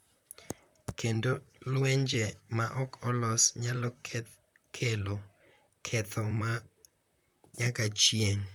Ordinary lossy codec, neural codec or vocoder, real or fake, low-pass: none; vocoder, 44.1 kHz, 128 mel bands, Pupu-Vocoder; fake; 19.8 kHz